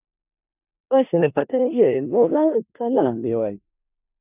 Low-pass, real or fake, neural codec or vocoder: 3.6 kHz; fake; codec, 16 kHz in and 24 kHz out, 0.4 kbps, LongCat-Audio-Codec, four codebook decoder